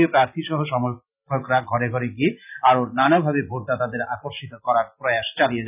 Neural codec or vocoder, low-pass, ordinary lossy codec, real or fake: none; 3.6 kHz; none; real